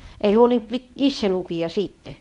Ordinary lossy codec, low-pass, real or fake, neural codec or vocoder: none; 10.8 kHz; fake; codec, 24 kHz, 0.9 kbps, WavTokenizer, medium speech release version 1